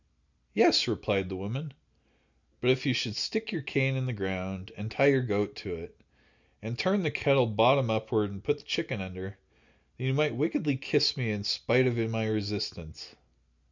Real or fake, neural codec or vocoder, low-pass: real; none; 7.2 kHz